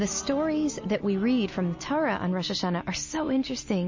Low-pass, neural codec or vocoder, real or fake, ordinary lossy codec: 7.2 kHz; none; real; MP3, 32 kbps